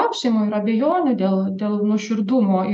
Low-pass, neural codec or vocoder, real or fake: 9.9 kHz; none; real